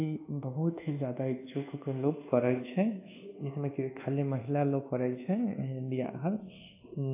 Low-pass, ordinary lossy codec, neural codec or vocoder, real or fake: 3.6 kHz; AAC, 24 kbps; codec, 24 kHz, 1.2 kbps, DualCodec; fake